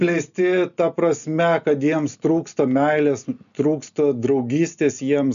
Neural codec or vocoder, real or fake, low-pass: none; real; 7.2 kHz